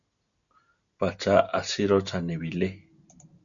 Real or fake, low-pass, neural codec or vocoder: real; 7.2 kHz; none